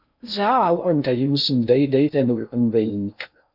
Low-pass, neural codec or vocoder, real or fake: 5.4 kHz; codec, 16 kHz in and 24 kHz out, 0.6 kbps, FocalCodec, streaming, 2048 codes; fake